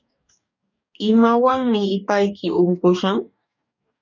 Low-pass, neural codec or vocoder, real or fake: 7.2 kHz; codec, 44.1 kHz, 2.6 kbps, DAC; fake